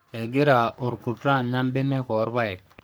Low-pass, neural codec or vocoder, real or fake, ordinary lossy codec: none; codec, 44.1 kHz, 3.4 kbps, Pupu-Codec; fake; none